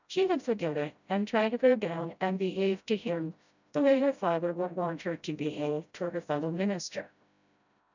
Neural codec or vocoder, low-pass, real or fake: codec, 16 kHz, 0.5 kbps, FreqCodec, smaller model; 7.2 kHz; fake